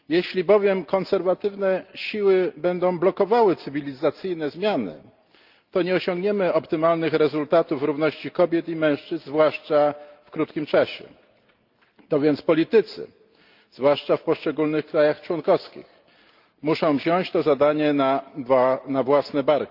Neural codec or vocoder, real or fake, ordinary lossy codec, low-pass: none; real; Opus, 24 kbps; 5.4 kHz